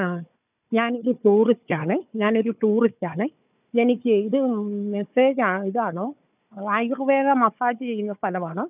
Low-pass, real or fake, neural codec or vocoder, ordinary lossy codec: 3.6 kHz; fake; codec, 16 kHz, 4 kbps, FunCodec, trained on Chinese and English, 50 frames a second; none